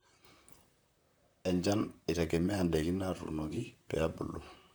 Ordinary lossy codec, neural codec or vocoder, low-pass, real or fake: none; vocoder, 44.1 kHz, 128 mel bands, Pupu-Vocoder; none; fake